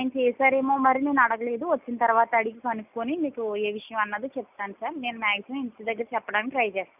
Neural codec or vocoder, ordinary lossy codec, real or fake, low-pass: none; none; real; 3.6 kHz